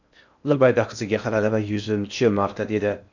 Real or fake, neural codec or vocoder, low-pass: fake; codec, 16 kHz in and 24 kHz out, 0.6 kbps, FocalCodec, streaming, 2048 codes; 7.2 kHz